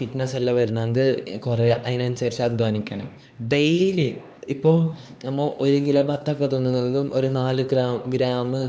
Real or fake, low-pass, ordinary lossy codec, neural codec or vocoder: fake; none; none; codec, 16 kHz, 2 kbps, X-Codec, HuBERT features, trained on LibriSpeech